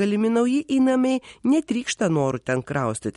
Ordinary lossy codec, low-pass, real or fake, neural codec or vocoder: MP3, 48 kbps; 10.8 kHz; real; none